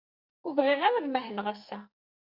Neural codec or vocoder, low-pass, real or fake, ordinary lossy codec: codec, 44.1 kHz, 2.6 kbps, SNAC; 5.4 kHz; fake; Opus, 64 kbps